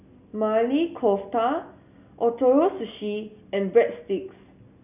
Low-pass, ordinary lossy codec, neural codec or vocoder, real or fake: 3.6 kHz; none; none; real